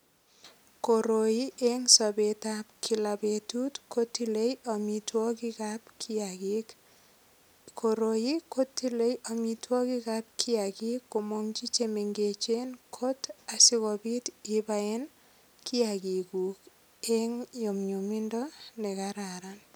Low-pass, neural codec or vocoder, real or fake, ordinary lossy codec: none; none; real; none